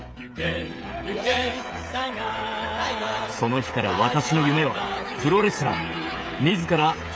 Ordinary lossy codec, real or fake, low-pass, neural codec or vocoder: none; fake; none; codec, 16 kHz, 16 kbps, FreqCodec, smaller model